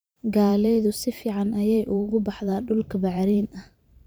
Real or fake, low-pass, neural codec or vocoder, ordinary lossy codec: fake; none; vocoder, 44.1 kHz, 128 mel bands every 512 samples, BigVGAN v2; none